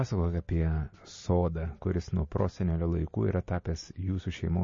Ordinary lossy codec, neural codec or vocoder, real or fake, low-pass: MP3, 32 kbps; none; real; 7.2 kHz